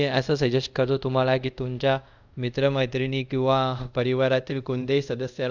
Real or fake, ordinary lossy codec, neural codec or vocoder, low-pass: fake; none; codec, 24 kHz, 0.5 kbps, DualCodec; 7.2 kHz